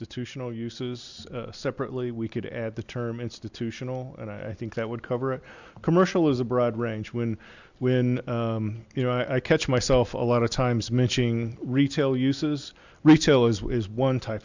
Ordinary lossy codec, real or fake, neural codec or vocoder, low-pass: Opus, 64 kbps; real; none; 7.2 kHz